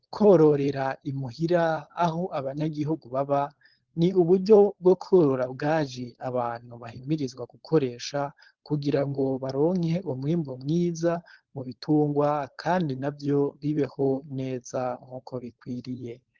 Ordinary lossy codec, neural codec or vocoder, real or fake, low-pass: Opus, 16 kbps; codec, 16 kHz, 4.8 kbps, FACodec; fake; 7.2 kHz